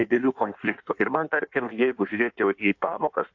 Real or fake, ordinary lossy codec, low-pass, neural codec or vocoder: fake; AAC, 48 kbps; 7.2 kHz; codec, 16 kHz in and 24 kHz out, 1.1 kbps, FireRedTTS-2 codec